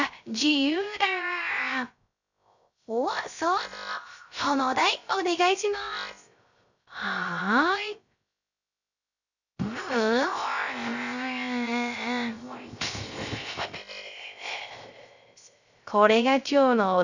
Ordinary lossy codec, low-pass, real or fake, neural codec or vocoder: none; 7.2 kHz; fake; codec, 16 kHz, 0.3 kbps, FocalCodec